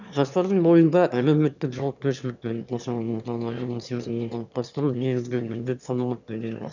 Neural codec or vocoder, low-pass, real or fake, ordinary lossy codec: autoencoder, 22.05 kHz, a latent of 192 numbers a frame, VITS, trained on one speaker; 7.2 kHz; fake; none